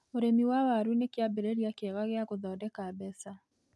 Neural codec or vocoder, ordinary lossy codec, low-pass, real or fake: none; none; none; real